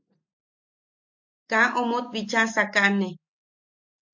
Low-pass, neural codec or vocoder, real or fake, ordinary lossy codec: 7.2 kHz; none; real; MP3, 48 kbps